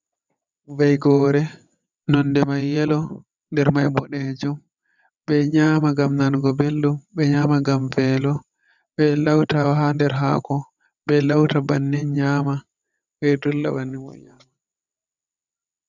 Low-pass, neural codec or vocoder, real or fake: 7.2 kHz; vocoder, 22.05 kHz, 80 mel bands, WaveNeXt; fake